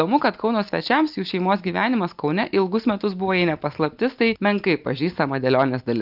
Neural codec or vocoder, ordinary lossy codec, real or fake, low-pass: none; Opus, 32 kbps; real; 5.4 kHz